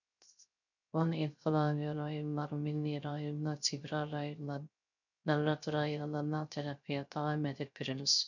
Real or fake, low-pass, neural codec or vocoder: fake; 7.2 kHz; codec, 16 kHz, 0.3 kbps, FocalCodec